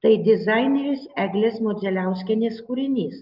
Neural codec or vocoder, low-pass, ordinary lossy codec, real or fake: none; 5.4 kHz; Opus, 24 kbps; real